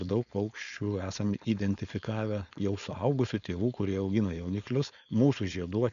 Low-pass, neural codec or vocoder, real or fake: 7.2 kHz; codec, 16 kHz, 4.8 kbps, FACodec; fake